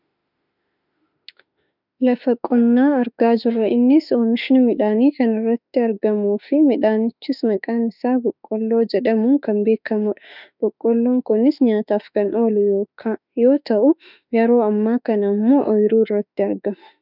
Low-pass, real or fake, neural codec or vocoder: 5.4 kHz; fake; autoencoder, 48 kHz, 32 numbers a frame, DAC-VAE, trained on Japanese speech